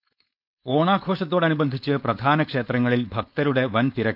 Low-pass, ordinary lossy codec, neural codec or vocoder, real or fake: 5.4 kHz; none; codec, 16 kHz, 4.8 kbps, FACodec; fake